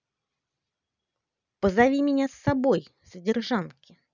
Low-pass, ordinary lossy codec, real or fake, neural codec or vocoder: 7.2 kHz; none; real; none